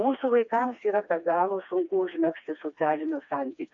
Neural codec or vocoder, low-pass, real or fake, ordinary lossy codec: codec, 16 kHz, 2 kbps, FreqCodec, smaller model; 7.2 kHz; fake; AAC, 48 kbps